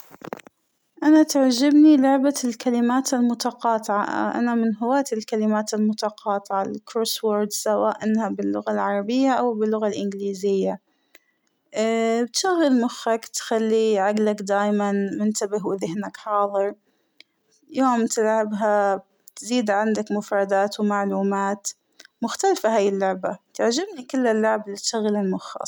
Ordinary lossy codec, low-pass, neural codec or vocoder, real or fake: none; none; none; real